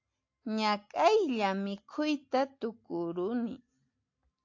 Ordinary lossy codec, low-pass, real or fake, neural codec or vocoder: AAC, 48 kbps; 7.2 kHz; real; none